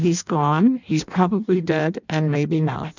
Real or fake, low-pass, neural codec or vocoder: fake; 7.2 kHz; codec, 16 kHz in and 24 kHz out, 0.6 kbps, FireRedTTS-2 codec